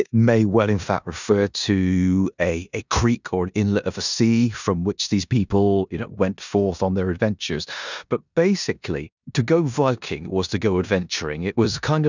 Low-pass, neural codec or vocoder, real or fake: 7.2 kHz; codec, 16 kHz in and 24 kHz out, 0.9 kbps, LongCat-Audio-Codec, four codebook decoder; fake